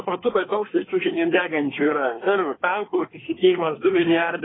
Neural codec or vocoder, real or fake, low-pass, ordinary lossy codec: codec, 24 kHz, 1 kbps, SNAC; fake; 7.2 kHz; AAC, 16 kbps